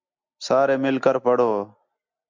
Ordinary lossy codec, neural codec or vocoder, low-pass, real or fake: MP3, 64 kbps; none; 7.2 kHz; real